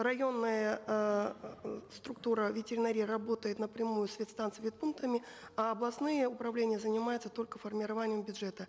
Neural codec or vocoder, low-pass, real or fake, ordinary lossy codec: none; none; real; none